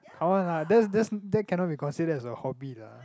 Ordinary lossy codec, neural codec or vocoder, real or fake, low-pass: none; none; real; none